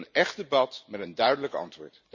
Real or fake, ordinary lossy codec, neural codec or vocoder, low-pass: real; none; none; 7.2 kHz